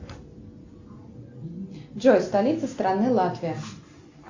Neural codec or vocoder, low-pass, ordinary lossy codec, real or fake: none; 7.2 kHz; AAC, 48 kbps; real